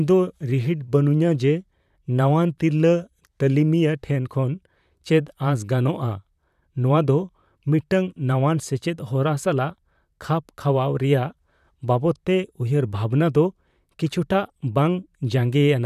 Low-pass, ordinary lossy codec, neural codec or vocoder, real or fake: 14.4 kHz; none; vocoder, 44.1 kHz, 128 mel bands, Pupu-Vocoder; fake